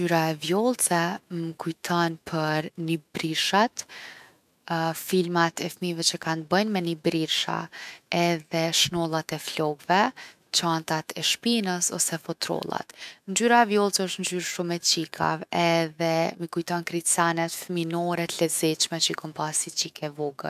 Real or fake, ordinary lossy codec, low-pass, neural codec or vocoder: fake; none; 14.4 kHz; autoencoder, 48 kHz, 128 numbers a frame, DAC-VAE, trained on Japanese speech